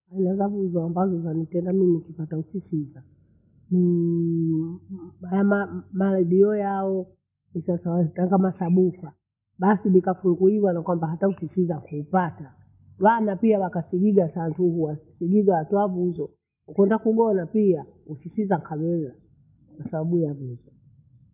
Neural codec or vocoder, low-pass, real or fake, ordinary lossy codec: none; 3.6 kHz; real; none